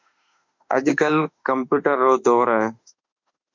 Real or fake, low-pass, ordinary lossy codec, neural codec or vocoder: fake; 7.2 kHz; MP3, 64 kbps; autoencoder, 48 kHz, 32 numbers a frame, DAC-VAE, trained on Japanese speech